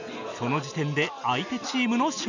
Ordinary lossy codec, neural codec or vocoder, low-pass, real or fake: none; none; 7.2 kHz; real